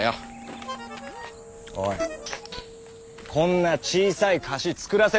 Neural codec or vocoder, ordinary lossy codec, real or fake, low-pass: none; none; real; none